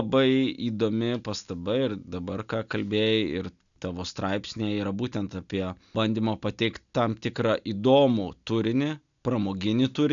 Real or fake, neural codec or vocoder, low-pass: real; none; 7.2 kHz